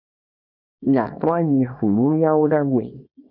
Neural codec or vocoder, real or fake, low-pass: codec, 24 kHz, 0.9 kbps, WavTokenizer, small release; fake; 5.4 kHz